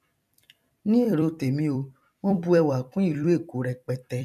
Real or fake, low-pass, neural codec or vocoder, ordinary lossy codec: fake; 14.4 kHz; vocoder, 44.1 kHz, 128 mel bands every 256 samples, BigVGAN v2; none